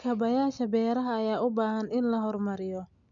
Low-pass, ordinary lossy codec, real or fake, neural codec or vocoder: 7.2 kHz; none; real; none